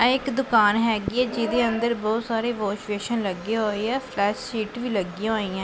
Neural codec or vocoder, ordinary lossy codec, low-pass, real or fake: none; none; none; real